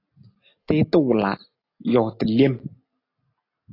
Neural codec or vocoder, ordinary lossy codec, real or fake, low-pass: none; AAC, 32 kbps; real; 5.4 kHz